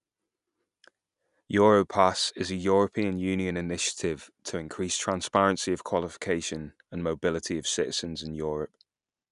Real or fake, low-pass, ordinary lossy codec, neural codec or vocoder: real; 10.8 kHz; none; none